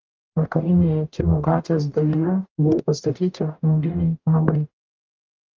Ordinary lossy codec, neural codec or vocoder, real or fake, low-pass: Opus, 24 kbps; codec, 44.1 kHz, 0.9 kbps, DAC; fake; 7.2 kHz